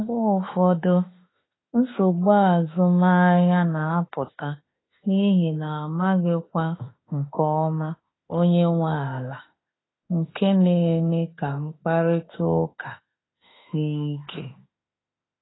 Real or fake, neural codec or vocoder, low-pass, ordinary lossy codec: fake; autoencoder, 48 kHz, 32 numbers a frame, DAC-VAE, trained on Japanese speech; 7.2 kHz; AAC, 16 kbps